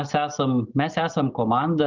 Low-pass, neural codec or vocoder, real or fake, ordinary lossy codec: 7.2 kHz; none; real; Opus, 32 kbps